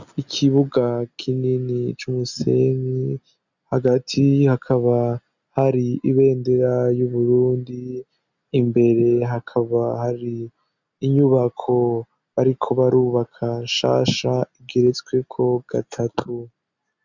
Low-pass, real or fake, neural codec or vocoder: 7.2 kHz; real; none